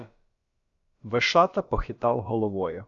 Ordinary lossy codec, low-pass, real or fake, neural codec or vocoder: Opus, 64 kbps; 7.2 kHz; fake; codec, 16 kHz, about 1 kbps, DyCAST, with the encoder's durations